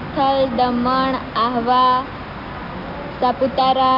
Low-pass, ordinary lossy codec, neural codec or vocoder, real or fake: 5.4 kHz; AAC, 48 kbps; none; real